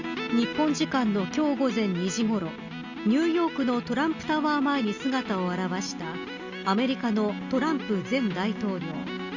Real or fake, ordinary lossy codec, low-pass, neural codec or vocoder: real; Opus, 64 kbps; 7.2 kHz; none